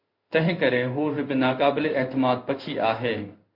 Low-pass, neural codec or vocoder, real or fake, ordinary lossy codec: 5.4 kHz; codec, 16 kHz in and 24 kHz out, 1 kbps, XY-Tokenizer; fake; MP3, 32 kbps